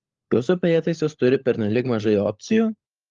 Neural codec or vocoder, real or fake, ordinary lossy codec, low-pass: codec, 16 kHz, 16 kbps, FunCodec, trained on LibriTTS, 50 frames a second; fake; Opus, 32 kbps; 7.2 kHz